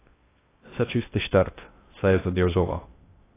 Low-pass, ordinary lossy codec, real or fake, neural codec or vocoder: 3.6 kHz; AAC, 16 kbps; fake; codec, 16 kHz in and 24 kHz out, 0.6 kbps, FocalCodec, streaming, 2048 codes